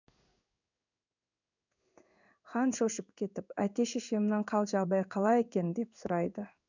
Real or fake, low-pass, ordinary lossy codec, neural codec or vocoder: fake; 7.2 kHz; none; codec, 16 kHz in and 24 kHz out, 1 kbps, XY-Tokenizer